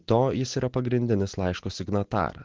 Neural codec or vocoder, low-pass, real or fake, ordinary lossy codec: none; 7.2 kHz; real; Opus, 16 kbps